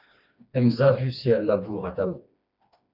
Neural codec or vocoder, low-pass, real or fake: codec, 16 kHz, 2 kbps, FreqCodec, smaller model; 5.4 kHz; fake